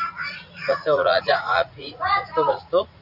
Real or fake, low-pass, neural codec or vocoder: fake; 5.4 kHz; vocoder, 44.1 kHz, 80 mel bands, Vocos